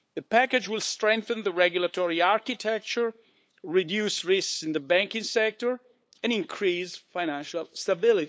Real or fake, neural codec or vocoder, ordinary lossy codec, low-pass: fake; codec, 16 kHz, 8 kbps, FunCodec, trained on LibriTTS, 25 frames a second; none; none